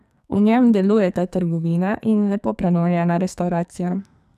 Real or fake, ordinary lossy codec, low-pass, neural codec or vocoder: fake; none; 14.4 kHz; codec, 44.1 kHz, 2.6 kbps, SNAC